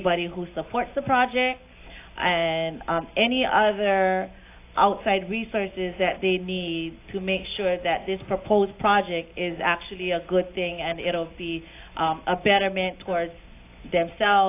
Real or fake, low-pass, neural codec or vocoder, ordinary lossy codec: real; 3.6 kHz; none; AAC, 24 kbps